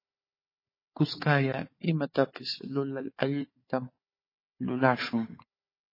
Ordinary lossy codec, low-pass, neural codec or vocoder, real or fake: MP3, 24 kbps; 5.4 kHz; codec, 16 kHz, 4 kbps, FunCodec, trained on Chinese and English, 50 frames a second; fake